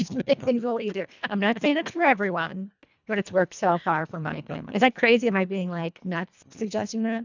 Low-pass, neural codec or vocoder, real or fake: 7.2 kHz; codec, 24 kHz, 1.5 kbps, HILCodec; fake